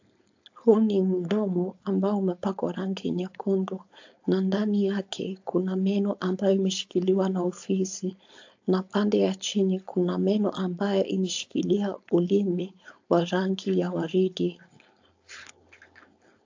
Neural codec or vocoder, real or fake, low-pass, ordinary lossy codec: codec, 16 kHz, 4.8 kbps, FACodec; fake; 7.2 kHz; AAC, 48 kbps